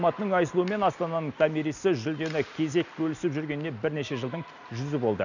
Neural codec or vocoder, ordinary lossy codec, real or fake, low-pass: none; none; real; 7.2 kHz